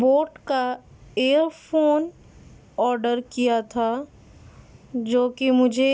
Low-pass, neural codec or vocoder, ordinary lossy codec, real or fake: none; none; none; real